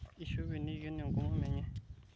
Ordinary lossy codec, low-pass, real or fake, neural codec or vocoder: none; none; real; none